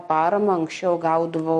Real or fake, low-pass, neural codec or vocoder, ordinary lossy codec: real; 14.4 kHz; none; MP3, 48 kbps